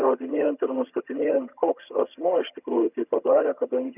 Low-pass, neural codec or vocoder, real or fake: 3.6 kHz; vocoder, 22.05 kHz, 80 mel bands, HiFi-GAN; fake